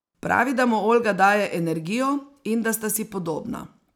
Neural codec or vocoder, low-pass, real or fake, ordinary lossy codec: none; 19.8 kHz; real; none